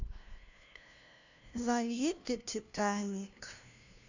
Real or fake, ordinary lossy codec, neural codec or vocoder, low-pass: fake; none; codec, 16 kHz, 0.5 kbps, FunCodec, trained on LibriTTS, 25 frames a second; 7.2 kHz